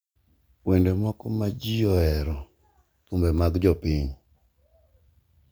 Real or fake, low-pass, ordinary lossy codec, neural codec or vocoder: real; none; none; none